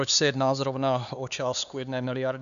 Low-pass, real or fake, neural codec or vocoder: 7.2 kHz; fake; codec, 16 kHz, 4 kbps, X-Codec, HuBERT features, trained on LibriSpeech